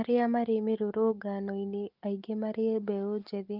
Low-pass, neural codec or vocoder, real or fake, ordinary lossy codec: 5.4 kHz; none; real; Opus, 32 kbps